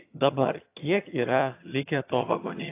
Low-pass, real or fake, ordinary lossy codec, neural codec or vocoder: 3.6 kHz; fake; AAC, 24 kbps; vocoder, 22.05 kHz, 80 mel bands, HiFi-GAN